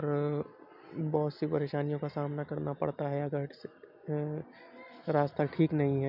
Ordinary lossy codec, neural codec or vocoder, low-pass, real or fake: none; none; 5.4 kHz; real